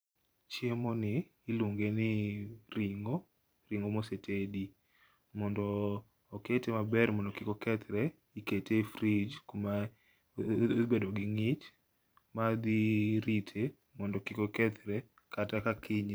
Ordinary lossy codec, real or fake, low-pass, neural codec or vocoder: none; fake; none; vocoder, 44.1 kHz, 128 mel bands every 512 samples, BigVGAN v2